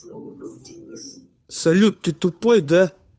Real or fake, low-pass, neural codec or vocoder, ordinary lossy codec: fake; none; codec, 16 kHz, 2 kbps, FunCodec, trained on Chinese and English, 25 frames a second; none